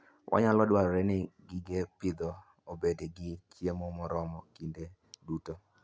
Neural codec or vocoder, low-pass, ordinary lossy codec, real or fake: none; none; none; real